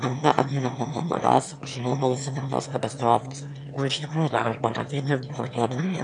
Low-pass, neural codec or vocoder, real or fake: 9.9 kHz; autoencoder, 22.05 kHz, a latent of 192 numbers a frame, VITS, trained on one speaker; fake